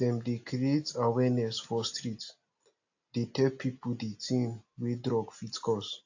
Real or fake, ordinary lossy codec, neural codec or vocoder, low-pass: real; AAC, 48 kbps; none; 7.2 kHz